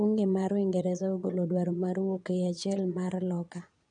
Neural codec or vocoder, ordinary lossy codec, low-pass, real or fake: vocoder, 22.05 kHz, 80 mel bands, Vocos; none; 9.9 kHz; fake